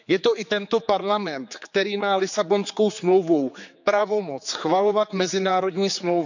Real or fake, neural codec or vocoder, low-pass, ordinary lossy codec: fake; codec, 16 kHz, 4 kbps, X-Codec, HuBERT features, trained on general audio; 7.2 kHz; none